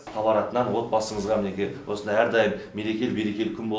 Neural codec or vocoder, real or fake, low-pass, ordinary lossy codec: none; real; none; none